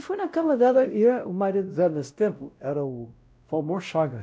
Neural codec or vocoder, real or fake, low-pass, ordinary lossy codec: codec, 16 kHz, 0.5 kbps, X-Codec, WavLM features, trained on Multilingual LibriSpeech; fake; none; none